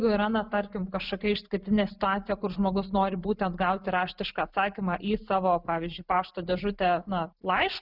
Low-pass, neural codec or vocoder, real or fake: 5.4 kHz; none; real